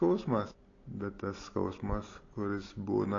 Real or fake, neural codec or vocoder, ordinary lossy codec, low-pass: real; none; AAC, 32 kbps; 7.2 kHz